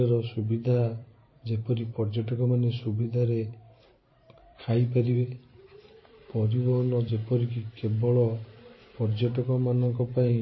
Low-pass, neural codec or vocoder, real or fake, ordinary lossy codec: 7.2 kHz; vocoder, 44.1 kHz, 128 mel bands every 512 samples, BigVGAN v2; fake; MP3, 24 kbps